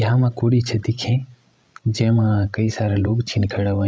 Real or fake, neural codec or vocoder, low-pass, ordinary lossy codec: fake; codec, 16 kHz, 16 kbps, FreqCodec, larger model; none; none